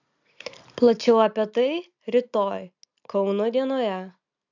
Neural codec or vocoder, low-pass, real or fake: none; 7.2 kHz; real